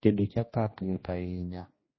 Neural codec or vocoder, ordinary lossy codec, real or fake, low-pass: codec, 16 kHz, 1 kbps, X-Codec, HuBERT features, trained on general audio; MP3, 24 kbps; fake; 7.2 kHz